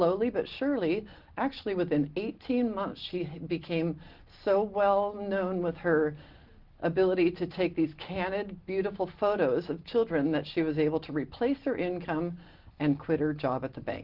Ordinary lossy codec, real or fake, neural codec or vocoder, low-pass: Opus, 16 kbps; real; none; 5.4 kHz